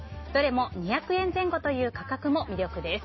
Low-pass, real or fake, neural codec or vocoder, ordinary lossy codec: 7.2 kHz; real; none; MP3, 24 kbps